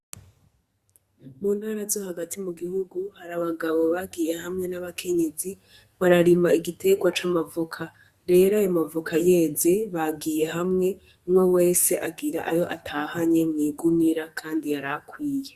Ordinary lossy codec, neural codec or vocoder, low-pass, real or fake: Opus, 64 kbps; codec, 44.1 kHz, 2.6 kbps, SNAC; 14.4 kHz; fake